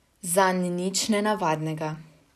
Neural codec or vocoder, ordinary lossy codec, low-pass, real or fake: none; none; 14.4 kHz; real